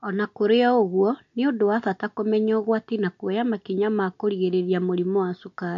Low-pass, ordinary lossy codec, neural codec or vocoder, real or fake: 7.2 kHz; MP3, 48 kbps; none; real